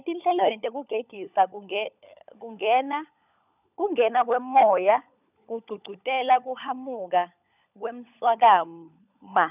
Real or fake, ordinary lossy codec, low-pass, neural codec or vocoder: fake; none; 3.6 kHz; codec, 16 kHz, 16 kbps, FunCodec, trained on LibriTTS, 50 frames a second